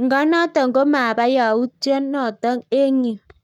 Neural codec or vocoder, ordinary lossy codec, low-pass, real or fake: codec, 44.1 kHz, 7.8 kbps, Pupu-Codec; none; 19.8 kHz; fake